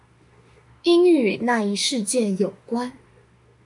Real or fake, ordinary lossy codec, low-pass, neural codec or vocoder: fake; MP3, 96 kbps; 10.8 kHz; autoencoder, 48 kHz, 32 numbers a frame, DAC-VAE, trained on Japanese speech